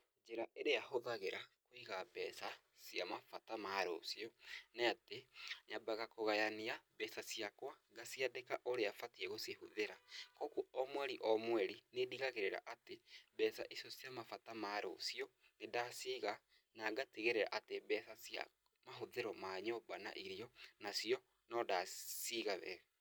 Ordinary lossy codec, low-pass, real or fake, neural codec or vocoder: none; none; real; none